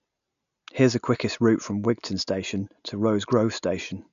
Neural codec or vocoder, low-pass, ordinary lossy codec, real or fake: none; 7.2 kHz; none; real